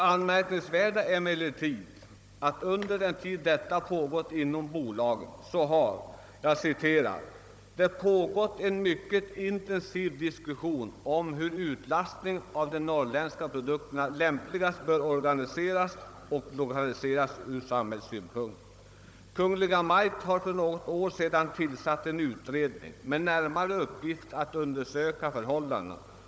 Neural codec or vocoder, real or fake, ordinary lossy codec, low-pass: codec, 16 kHz, 16 kbps, FunCodec, trained on Chinese and English, 50 frames a second; fake; none; none